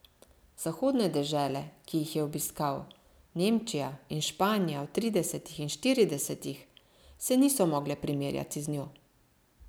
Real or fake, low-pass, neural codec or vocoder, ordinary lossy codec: real; none; none; none